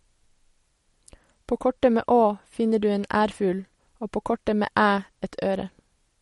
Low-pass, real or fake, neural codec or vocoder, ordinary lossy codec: 19.8 kHz; real; none; MP3, 48 kbps